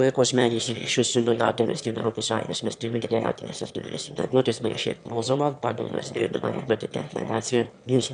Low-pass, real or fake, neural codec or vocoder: 9.9 kHz; fake; autoencoder, 22.05 kHz, a latent of 192 numbers a frame, VITS, trained on one speaker